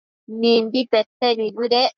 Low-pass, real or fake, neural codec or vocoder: 7.2 kHz; fake; codec, 44.1 kHz, 3.4 kbps, Pupu-Codec